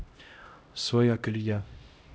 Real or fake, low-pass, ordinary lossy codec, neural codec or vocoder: fake; none; none; codec, 16 kHz, 0.5 kbps, X-Codec, HuBERT features, trained on LibriSpeech